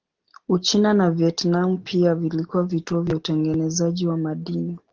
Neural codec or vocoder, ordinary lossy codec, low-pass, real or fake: none; Opus, 16 kbps; 7.2 kHz; real